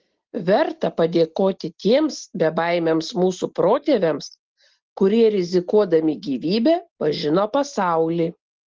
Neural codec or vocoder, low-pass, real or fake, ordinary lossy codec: none; 7.2 kHz; real; Opus, 16 kbps